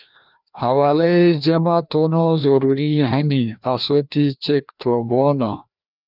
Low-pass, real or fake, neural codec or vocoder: 5.4 kHz; fake; codec, 16 kHz, 1 kbps, FreqCodec, larger model